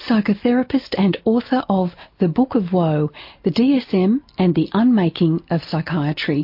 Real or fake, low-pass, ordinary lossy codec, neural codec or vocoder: real; 5.4 kHz; MP3, 32 kbps; none